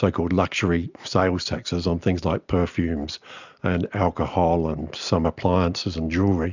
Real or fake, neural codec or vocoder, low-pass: real; none; 7.2 kHz